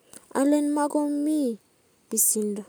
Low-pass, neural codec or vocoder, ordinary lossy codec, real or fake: none; none; none; real